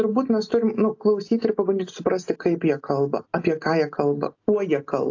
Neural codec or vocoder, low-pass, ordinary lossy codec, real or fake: none; 7.2 kHz; AAC, 48 kbps; real